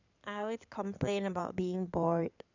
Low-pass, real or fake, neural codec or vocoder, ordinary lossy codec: 7.2 kHz; fake; codec, 16 kHz in and 24 kHz out, 2.2 kbps, FireRedTTS-2 codec; none